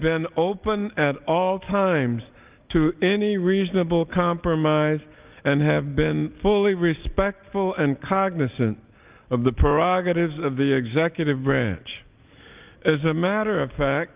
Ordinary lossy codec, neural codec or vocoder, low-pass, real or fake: Opus, 24 kbps; none; 3.6 kHz; real